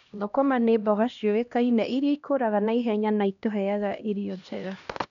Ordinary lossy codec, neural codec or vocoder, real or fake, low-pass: none; codec, 16 kHz, 1 kbps, X-Codec, HuBERT features, trained on LibriSpeech; fake; 7.2 kHz